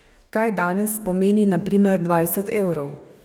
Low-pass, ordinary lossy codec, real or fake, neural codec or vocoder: 19.8 kHz; none; fake; codec, 44.1 kHz, 2.6 kbps, DAC